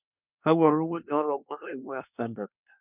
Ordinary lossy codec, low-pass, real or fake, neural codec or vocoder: none; 3.6 kHz; fake; codec, 24 kHz, 1 kbps, SNAC